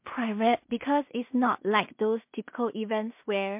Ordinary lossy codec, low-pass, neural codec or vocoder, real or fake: MP3, 32 kbps; 3.6 kHz; codec, 16 kHz in and 24 kHz out, 0.4 kbps, LongCat-Audio-Codec, two codebook decoder; fake